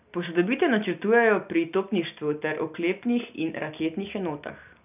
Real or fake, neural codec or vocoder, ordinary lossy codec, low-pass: real; none; none; 3.6 kHz